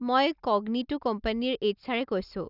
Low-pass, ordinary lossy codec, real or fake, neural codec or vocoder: 5.4 kHz; none; real; none